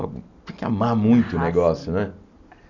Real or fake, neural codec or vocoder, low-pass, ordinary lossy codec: real; none; 7.2 kHz; none